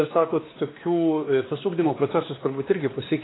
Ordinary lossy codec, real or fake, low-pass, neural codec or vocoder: AAC, 16 kbps; fake; 7.2 kHz; codec, 16 kHz, 2 kbps, X-Codec, WavLM features, trained on Multilingual LibriSpeech